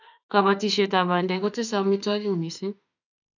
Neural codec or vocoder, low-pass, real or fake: autoencoder, 48 kHz, 32 numbers a frame, DAC-VAE, trained on Japanese speech; 7.2 kHz; fake